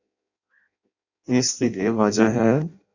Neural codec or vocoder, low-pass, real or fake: codec, 16 kHz in and 24 kHz out, 0.6 kbps, FireRedTTS-2 codec; 7.2 kHz; fake